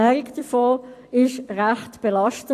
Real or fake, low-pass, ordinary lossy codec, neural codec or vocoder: fake; 14.4 kHz; AAC, 64 kbps; vocoder, 44.1 kHz, 128 mel bands every 256 samples, BigVGAN v2